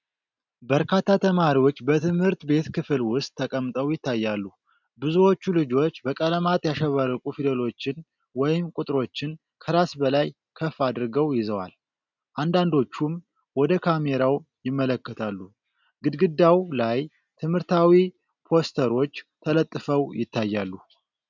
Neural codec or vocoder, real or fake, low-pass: none; real; 7.2 kHz